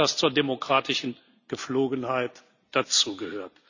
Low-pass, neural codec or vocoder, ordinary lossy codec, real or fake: 7.2 kHz; none; none; real